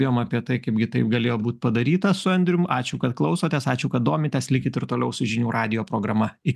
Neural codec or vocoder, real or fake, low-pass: none; real; 14.4 kHz